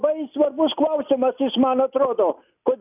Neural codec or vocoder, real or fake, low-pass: none; real; 3.6 kHz